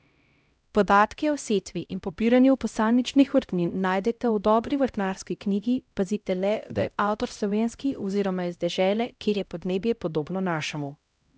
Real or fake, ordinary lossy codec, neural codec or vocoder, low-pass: fake; none; codec, 16 kHz, 0.5 kbps, X-Codec, HuBERT features, trained on LibriSpeech; none